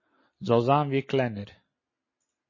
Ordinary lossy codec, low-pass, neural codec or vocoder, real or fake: MP3, 32 kbps; 7.2 kHz; none; real